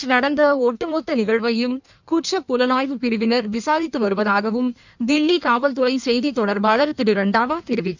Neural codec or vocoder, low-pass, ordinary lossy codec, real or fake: codec, 16 kHz in and 24 kHz out, 1.1 kbps, FireRedTTS-2 codec; 7.2 kHz; none; fake